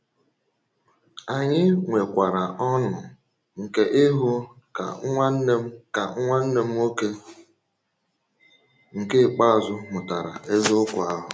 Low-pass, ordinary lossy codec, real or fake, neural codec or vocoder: none; none; real; none